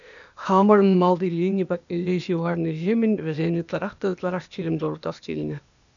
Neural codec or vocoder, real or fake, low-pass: codec, 16 kHz, 0.8 kbps, ZipCodec; fake; 7.2 kHz